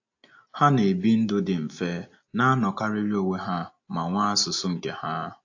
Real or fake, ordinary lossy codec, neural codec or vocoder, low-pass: real; AAC, 48 kbps; none; 7.2 kHz